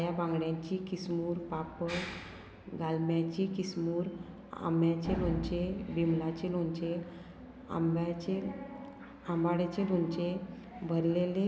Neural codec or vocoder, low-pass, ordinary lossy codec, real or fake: none; none; none; real